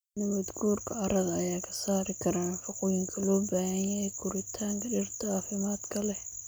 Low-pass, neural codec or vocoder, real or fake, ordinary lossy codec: none; none; real; none